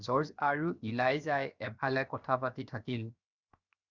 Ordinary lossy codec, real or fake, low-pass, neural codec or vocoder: Opus, 64 kbps; fake; 7.2 kHz; codec, 16 kHz, 0.7 kbps, FocalCodec